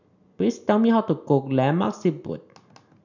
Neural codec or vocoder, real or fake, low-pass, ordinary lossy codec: none; real; 7.2 kHz; none